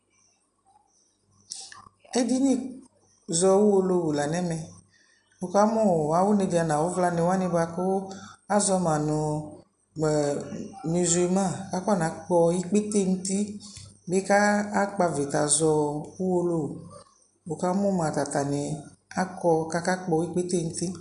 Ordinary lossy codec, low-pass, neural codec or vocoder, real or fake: MP3, 96 kbps; 10.8 kHz; none; real